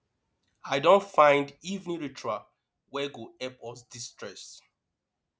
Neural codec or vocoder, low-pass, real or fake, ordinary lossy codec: none; none; real; none